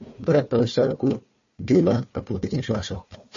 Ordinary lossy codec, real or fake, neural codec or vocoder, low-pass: MP3, 32 kbps; fake; codec, 16 kHz, 1 kbps, FunCodec, trained on Chinese and English, 50 frames a second; 7.2 kHz